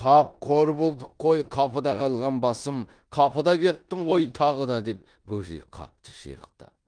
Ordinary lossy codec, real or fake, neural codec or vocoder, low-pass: Opus, 32 kbps; fake; codec, 16 kHz in and 24 kHz out, 0.9 kbps, LongCat-Audio-Codec, four codebook decoder; 9.9 kHz